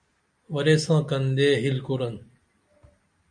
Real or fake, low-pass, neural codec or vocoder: real; 9.9 kHz; none